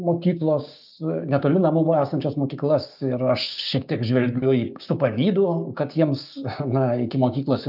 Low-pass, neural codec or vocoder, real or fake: 5.4 kHz; codec, 16 kHz, 6 kbps, DAC; fake